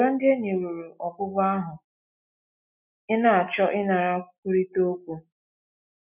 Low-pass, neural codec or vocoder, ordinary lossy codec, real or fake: 3.6 kHz; none; none; real